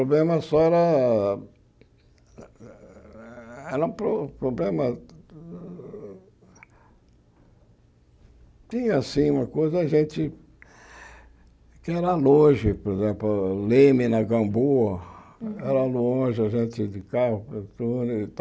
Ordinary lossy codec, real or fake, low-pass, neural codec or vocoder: none; real; none; none